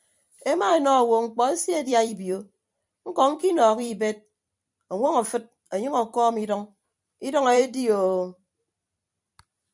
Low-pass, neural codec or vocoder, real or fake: 10.8 kHz; vocoder, 44.1 kHz, 128 mel bands every 256 samples, BigVGAN v2; fake